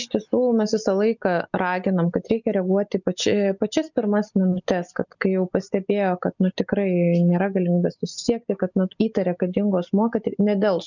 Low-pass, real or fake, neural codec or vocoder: 7.2 kHz; real; none